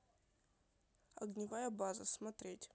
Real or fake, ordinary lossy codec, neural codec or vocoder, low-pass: real; none; none; none